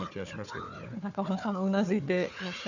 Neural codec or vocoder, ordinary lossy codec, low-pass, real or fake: codec, 16 kHz, 4 kbps, FunCodec, trained on Chinese and English, 50 frames a second; none; 7.2 kHz; fake